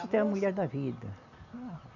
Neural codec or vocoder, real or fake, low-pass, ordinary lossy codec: none; real; 7.2 kHz; none